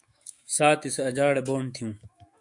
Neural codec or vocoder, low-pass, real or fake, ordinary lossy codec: autoencoder, 48 kHz, 128 numbers a frame, DAC-VAE, trained on Japanese speech; 10.8 kHz; fake; MP3, 64 kbps